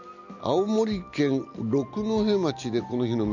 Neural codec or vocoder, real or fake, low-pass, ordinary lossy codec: none; real; 7.2 kHz; none